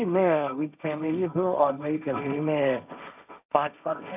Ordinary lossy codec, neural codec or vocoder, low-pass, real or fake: none; codec, 16 kHz, 1.1 kbps, Voila-Tokenizer; 3.6 kHz; fake